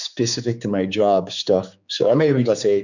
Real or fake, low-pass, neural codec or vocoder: fake; 7.2 kHz; codec, 16 kHz, 4 kbps, X-Codec, HuBERT features, trained on general audio